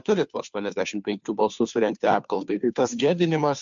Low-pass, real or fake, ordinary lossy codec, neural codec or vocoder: 7.2 kHz; fake; MP3, 64 kbps; codec, 16 kHz, 2 kbps, FunCodec, trained on Chinese and English, 25 frames a second